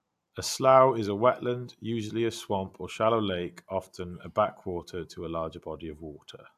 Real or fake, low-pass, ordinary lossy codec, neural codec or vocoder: real; 14.4 kHz; AAC, 96 kbps; none